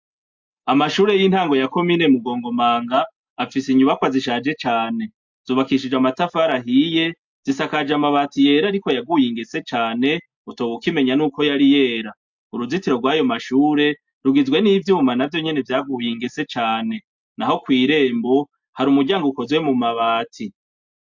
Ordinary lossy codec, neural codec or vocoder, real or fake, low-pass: MP3, 64 kbps; none; real; 7.2 kHz